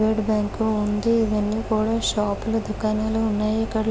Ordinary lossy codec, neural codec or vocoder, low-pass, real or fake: none; none; none; real